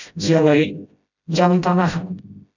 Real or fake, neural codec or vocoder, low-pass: fake; codec, 16 kHz, 0.5 kbps, FreqCodec, smaller model; 7.2 kHz